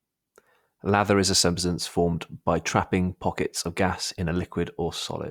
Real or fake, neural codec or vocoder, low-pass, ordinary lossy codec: real; none; 19.8 kHz; Opus, 64 kbps